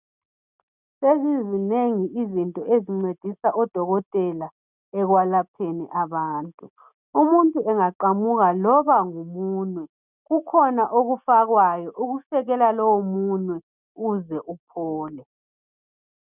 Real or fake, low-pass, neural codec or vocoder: real; 3.6 kHz; none